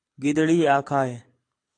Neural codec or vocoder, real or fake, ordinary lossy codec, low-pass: codec, 24 kHz, 6 kbps, HILCodec; fake; AAC, 48 kbps; 9.9 kHz